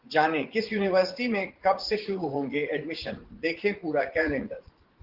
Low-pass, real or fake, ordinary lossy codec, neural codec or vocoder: 5.4 kHz; fake; Opus, 16 kbps; vocoder, 44.1 kHz, 80 mel bands, Vocos